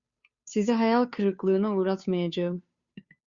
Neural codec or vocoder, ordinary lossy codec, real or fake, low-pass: codec, 16 kHz, 2 kbps, FunCodec, trained on Chinese and English, 25 frames a second; Opus, 64 kbps; fake; 7.2 kHz